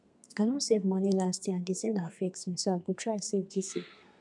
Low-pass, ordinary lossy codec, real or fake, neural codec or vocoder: 10.8 kHz; none; fake; codec, 32 kHz, 1.9 kbps, SNAC